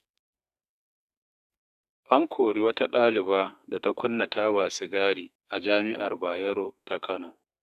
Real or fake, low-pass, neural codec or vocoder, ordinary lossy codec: fake; 14.4 kHz; codec, 32 kHz, 1.9 kbps, SNAC; none